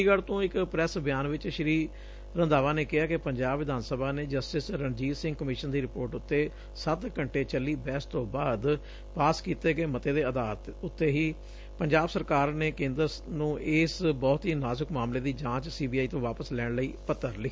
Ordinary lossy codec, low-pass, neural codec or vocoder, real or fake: none; none; none; real